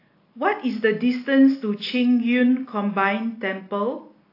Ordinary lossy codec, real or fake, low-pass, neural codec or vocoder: AAC, 32 kbps; real; 5.4 kHz; none